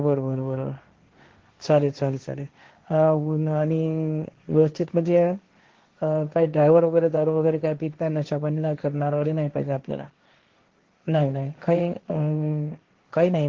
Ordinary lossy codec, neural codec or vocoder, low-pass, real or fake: Opus, 16 kbps; codec, 16 kHz, 1.1 kbps, Voila-Tokenizer; 7.2 kHz; fake